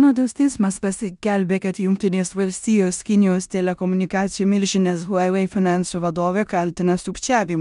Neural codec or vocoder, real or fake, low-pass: codec, 16 kHz in and 24 kHz out, 0.9 kbps, LongCat-Audio-Codec, four codebook decoder; fake; 10.8 kHz